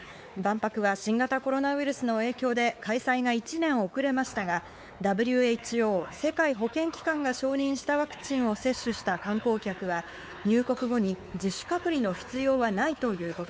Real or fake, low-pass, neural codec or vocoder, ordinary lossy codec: fake; none; codec, 16 kHz, 4 kbps, X-Codec, WavLM features, trained on Multilingual LibriSpeech; none